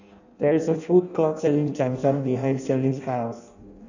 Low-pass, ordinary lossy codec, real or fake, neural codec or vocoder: 7.2 kHz; none; fake; codec, 16 kHz in and 24 kHz out, 0.6 kbps, FireRedTTS-2 codec